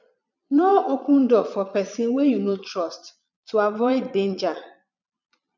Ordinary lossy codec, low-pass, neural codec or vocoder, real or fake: none; 7.2 kHz; vocoder, 22.05 kHz, 80 mel bands, Vocos; fake